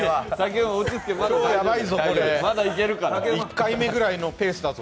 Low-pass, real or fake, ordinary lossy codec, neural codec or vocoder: none; real; none; none